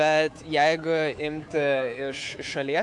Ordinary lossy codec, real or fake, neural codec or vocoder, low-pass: AAC, 64 kbps; fake; codec, 24 kHz, 3.1 kbps, DualCodec; 10.8 kHz